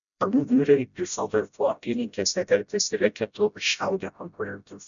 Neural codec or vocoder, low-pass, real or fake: codec, 16 kHz, 0.5 kbps, FreqCodec, smaller model; 7.2 kHz; fake